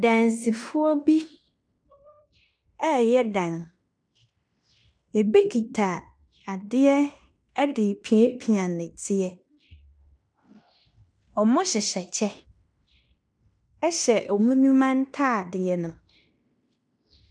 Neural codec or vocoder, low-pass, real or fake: codec, 16 kHz in and 24 kHz out, 0.9 kbps, LongCat-Audio-Codec, fine tuned four codebook decoder; 9.9 kHz; fake